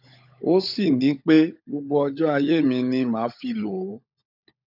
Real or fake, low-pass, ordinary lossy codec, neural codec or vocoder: fake; 5.4 kHz; none; codec, 16 kHz, 16 kbps, FunCodec, trained on LibriTTS, 50 frames a second